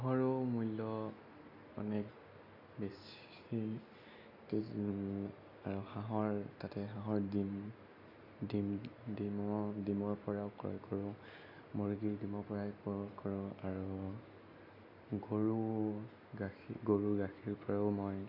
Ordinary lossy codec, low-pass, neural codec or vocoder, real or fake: AAC, 48 kbps; 5.4 kHz; none; real